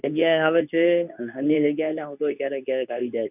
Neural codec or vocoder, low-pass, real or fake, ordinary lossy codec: codec, 24 kHz, 0.9 kbps, WavTokenizer, medium speech release version 2; 3.6 kHz; fake; none